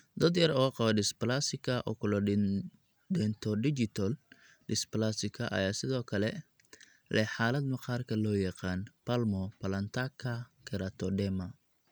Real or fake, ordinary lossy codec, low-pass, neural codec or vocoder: real; none; none; none